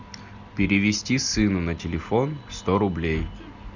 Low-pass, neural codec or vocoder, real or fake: 7.2 kHz; none; real